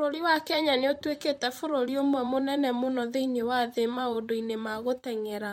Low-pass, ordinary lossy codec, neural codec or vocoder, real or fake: 19.8 kHz; MP3, 64 kbps; vocoder, 44.1 kHz, 128 mel bands, Pupu-Vocoder; fake